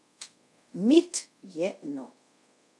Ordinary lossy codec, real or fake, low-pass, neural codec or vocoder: none; fake; none; codec, 24 kHz, 0.5 kbps, DualCodec